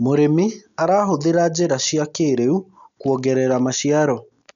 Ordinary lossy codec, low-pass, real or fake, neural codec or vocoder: none; 7.2 kHz; real; none